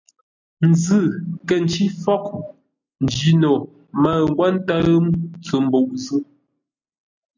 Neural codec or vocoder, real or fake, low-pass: none; real; 7.2 kHz